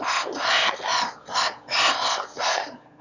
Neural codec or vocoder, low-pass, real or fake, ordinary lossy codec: autoencoder, 22.05 kHz, a latent of 192 numbers a frame, VITS, trained on one speaker; 7.2 kHz; fake; none